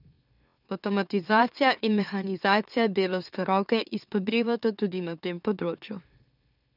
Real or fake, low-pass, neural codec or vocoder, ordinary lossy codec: fake; 5.4 kHz; autoencoder, 44.1 kHz, a latent of 192 numbers a frame, MeloTTS; none